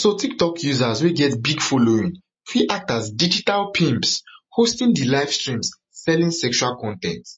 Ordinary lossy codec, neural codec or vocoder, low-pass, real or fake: MP3, 32 kbps; none; 9.9 kHz; real